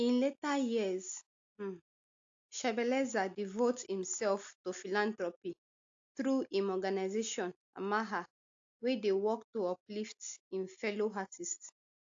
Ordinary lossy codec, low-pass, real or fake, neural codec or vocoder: AAC, 48 kbps; 7.2 kHz; real; none